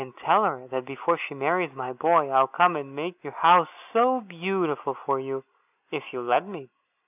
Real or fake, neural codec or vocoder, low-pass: real; none; 3.6 kHz